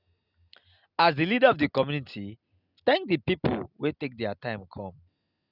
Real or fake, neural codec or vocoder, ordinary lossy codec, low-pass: real; none; none; 5.4 kHz